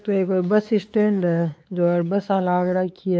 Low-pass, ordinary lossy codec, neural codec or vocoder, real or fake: none; none; codec, 16 kHz, 4 kbps, X-Codec, WavLM features, trained on Multilingual LibriSpeech; fake